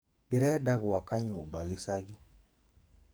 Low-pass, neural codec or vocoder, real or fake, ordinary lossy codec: none; codec, 44.1 kHz, 2.6 kbps, SNAC; fake; none